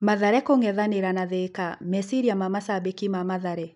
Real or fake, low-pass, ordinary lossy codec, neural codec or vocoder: real; 10.8 kHz; none; none